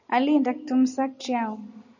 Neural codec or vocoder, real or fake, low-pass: none; real; 7.2 kHz